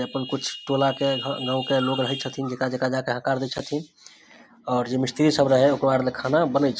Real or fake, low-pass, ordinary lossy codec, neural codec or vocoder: real; none; none; none